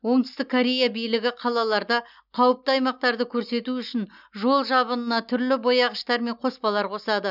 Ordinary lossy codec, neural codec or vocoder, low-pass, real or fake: none; none; 5.4 kHz; real